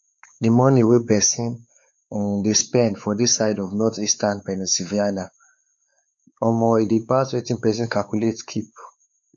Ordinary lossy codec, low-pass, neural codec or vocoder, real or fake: none; 7.2 kHz; codec, 16 kHz, 4 kbps, X-Codec, WavLM features, trained on Multilingual LibriSpeech; fake